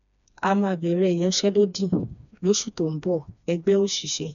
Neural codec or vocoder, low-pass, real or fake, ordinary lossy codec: codec, 16 kHz, 2 kbps, FreqCodec, smaller model; 7.2 kHz; fake; none